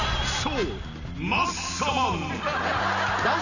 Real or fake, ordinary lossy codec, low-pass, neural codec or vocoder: real; none; 7.2 kHz; none